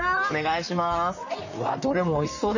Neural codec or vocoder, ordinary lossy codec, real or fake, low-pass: vocoder, 44.1 kHz, 128 mel bands, Pupu-Vocoder; none; fake; 7.2 kHz